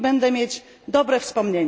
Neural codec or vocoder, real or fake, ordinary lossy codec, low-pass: none; real; none; none